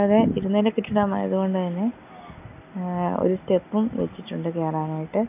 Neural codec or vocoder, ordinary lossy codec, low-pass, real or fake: none; none; 3.6 kHz; real